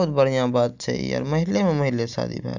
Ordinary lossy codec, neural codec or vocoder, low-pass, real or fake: Opus, 64 kbps; none; 7.2 kHz; real